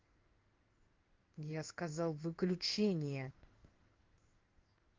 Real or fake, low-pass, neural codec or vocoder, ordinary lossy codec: fake; 7.2 kHz; codec, 16 kHz in and 24 kHz out, 1 kbps, XY-Tokenizer; Opus, 32 kbps